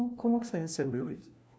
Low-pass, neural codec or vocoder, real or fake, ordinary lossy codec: none; codec, 16 kHz, 1 kbps, FunCodec, trained on LibriTTS, 50 frames a second; fake; none